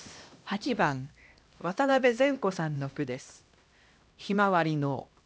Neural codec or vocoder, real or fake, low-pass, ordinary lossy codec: codec, 16 kHz, 1 kbps, X-Codec, HuBERT features, trained on LibriSpeech; fake; none; none